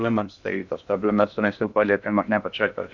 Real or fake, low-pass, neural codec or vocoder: fake; 7.2 kHz; codec, 16 kHz in and 24 kHz out, 0.6 kbps, FocalCodec, streaming, 2048 codes